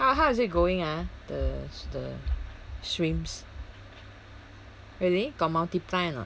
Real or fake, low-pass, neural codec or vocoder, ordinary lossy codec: real; none; none; none